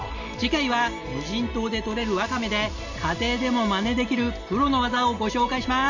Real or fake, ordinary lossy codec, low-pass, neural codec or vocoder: real; none; 7.2 kHz; none